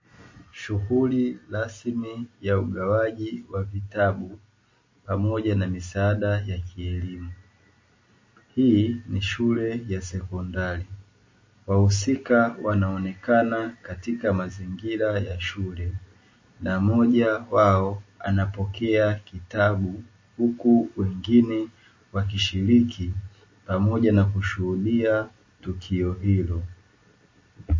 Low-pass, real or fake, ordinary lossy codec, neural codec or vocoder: 7.2 kHz; real; MP3, 32 kbps; none